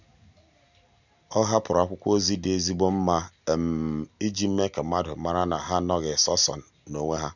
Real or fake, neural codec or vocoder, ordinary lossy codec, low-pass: real; none; none; 7.2 kHz